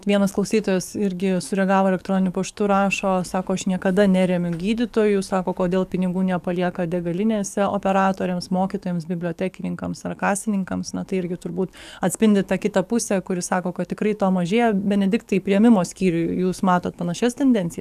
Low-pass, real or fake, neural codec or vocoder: 14.4 kHz; fake; codec, 44.1 kHz, 7.8 kbps, DAC